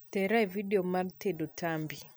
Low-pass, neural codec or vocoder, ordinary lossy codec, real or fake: none; none; none; real